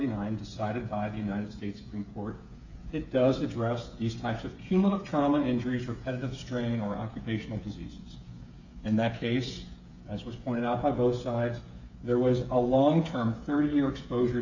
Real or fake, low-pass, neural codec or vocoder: fake; 7.2 kHz; codec, 16 kHz, 8 kbps, FreqCodec, smaller model